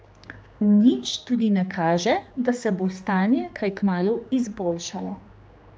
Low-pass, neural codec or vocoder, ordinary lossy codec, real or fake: none; codec, 16 kHz, 2 kbps, X-Codec, HuBERT features, trained on general audio; none; fake